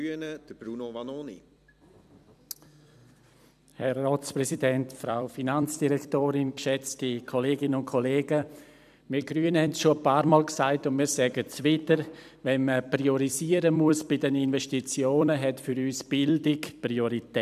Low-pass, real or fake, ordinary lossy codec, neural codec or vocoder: 14.4 kHz; fake; none; vocoder, 44.1 kHz, 128 mel bands every 256 samples, BigVGAN v2